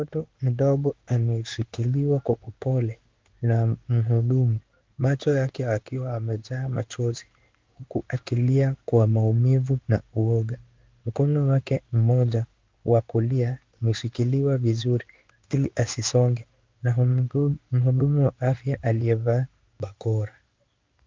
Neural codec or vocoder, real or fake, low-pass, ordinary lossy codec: codec, 16 kHz in and 24 kHz out, 1 kbps, XY-Tokenizer; fake; 7.2 kHz; Opus, 24 kbps